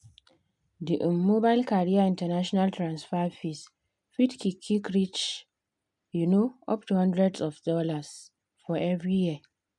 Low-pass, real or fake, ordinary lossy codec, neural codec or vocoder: 10.8 kHz; real; none; none